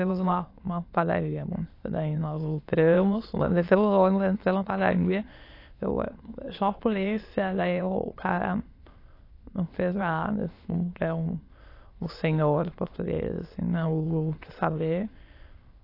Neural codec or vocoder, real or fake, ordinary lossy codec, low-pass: autoencoder, 22.05 kHz, a latent of 192 numbers a frame, VITS, trained on many speakers; fake; AAC, 32 kbps; 5.4 kHz